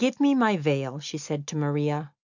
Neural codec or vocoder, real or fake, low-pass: none; real; 7.2 kHz